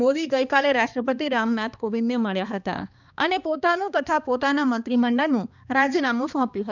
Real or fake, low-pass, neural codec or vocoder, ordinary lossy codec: fake; 7.2 kHz; codec, 16 kHz, 2 kbps, X-Codec, HuBERT features, trained on balanced general audio; none